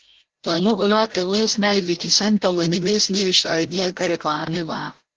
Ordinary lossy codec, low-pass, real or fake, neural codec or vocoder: Opus, 16 kbps; 7.2 kHz; fake; codec, 16 kHz, 0.5 kbps, FreqCodec, larger model